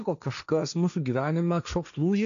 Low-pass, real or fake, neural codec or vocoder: 7.2 kHz; fake; codec, 16 kHz, 1.1 kbps, Voila-Tokenizer